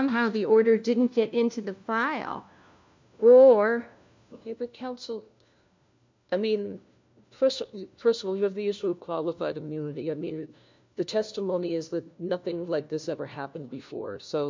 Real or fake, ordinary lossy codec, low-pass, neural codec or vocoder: fake; MP3, 64 kbps; 7.2 kHz; codec, 16 kHz, 1 kbps, FunCodec, trained on LibriTTS, 50 frames a second